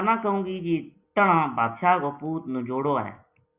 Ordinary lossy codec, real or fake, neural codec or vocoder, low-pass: Opus, 64 kbps; real; none; 3.6 kHz